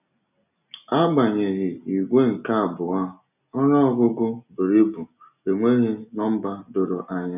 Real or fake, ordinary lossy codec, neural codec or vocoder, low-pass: real; none; none; 3.6 kHz